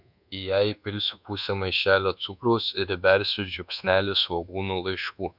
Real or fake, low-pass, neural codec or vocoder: fake; 5.4 kHz; codec, 24 kHz, 1.2 kbps, DualCodec